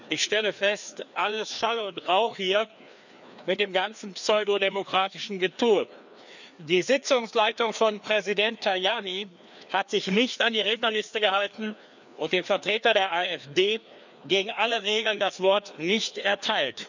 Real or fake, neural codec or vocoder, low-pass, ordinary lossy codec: fake; codec, 16 kHz, 2 kbps, FreqCodec, larger model; 7.2 kHz; none